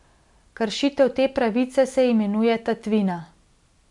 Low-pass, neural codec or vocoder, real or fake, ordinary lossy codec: 10.8 kHz; none; real; MP3, 96 kbps